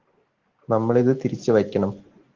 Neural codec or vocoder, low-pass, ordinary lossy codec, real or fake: none; 7.2 kHz; Opus, 16 kbps; real